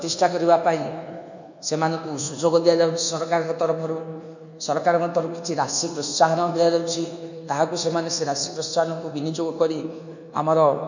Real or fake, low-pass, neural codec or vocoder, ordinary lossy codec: fake; 7.2 kHz; codec, 24 kHz, 1.2 kbps, DualCodec; none